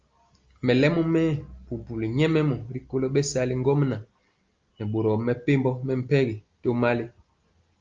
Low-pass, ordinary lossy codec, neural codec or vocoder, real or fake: 7.2 kHz; Opus, 32 kbps; none; real